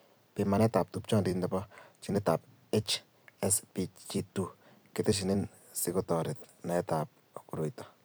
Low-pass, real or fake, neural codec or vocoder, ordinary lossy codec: none; fake; vocoder, 44.1 kHz, 128 mel bands every 256 samples, BigVGAN v2; none